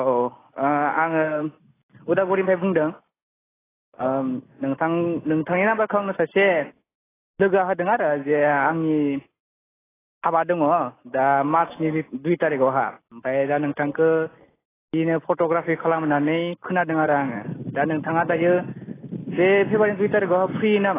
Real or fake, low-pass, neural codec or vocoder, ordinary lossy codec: real; 3.6 kHz; none; AAC, 16 kbps